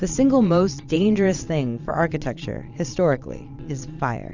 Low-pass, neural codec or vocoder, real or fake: 7.2 kHz; vocoder, 22.05 kHz, 80 mel bands, Vocos; fake